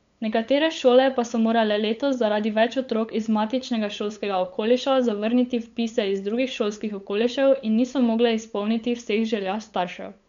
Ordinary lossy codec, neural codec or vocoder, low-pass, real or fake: MP3, 64 kbps; codec, 16 kHz, 8 kbps, FunCodec, trained on LibriTTS, 25 frames a second; 7.2 kHz; fake